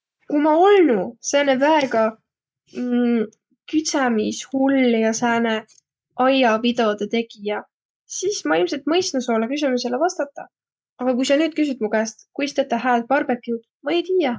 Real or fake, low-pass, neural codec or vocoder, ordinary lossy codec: real; none; none; none